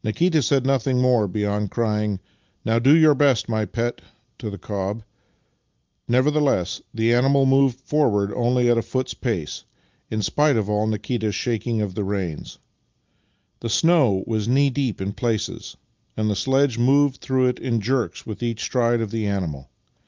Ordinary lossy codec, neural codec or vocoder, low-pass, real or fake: Opus, 24 kbps; none; 7.2 kHz; real